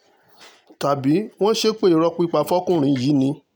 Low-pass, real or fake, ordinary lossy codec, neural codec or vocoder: 19.8 kHz; real; none; none